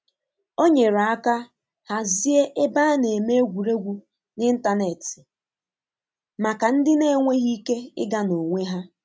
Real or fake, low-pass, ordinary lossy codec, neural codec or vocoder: real; none; none; none